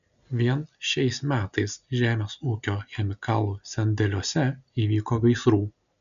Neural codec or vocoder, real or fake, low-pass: none; real; 7.2 kHz